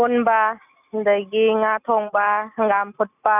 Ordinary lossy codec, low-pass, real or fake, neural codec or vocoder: none; 3.6 kHz; real; none